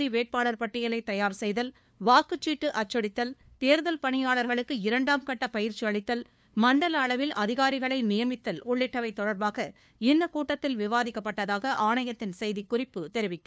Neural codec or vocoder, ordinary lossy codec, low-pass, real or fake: codec, 16 kHz, 2 kbps, FunCodec, trained on LibriTTS, 25 frames a second; none; none; fake